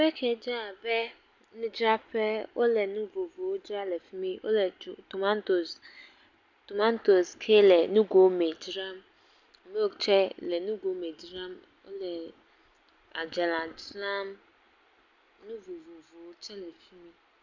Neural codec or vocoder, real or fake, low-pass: none; real; 7.2 kHz